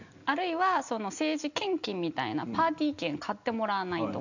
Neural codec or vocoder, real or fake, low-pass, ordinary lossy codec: none; real; 7.2 kHz; none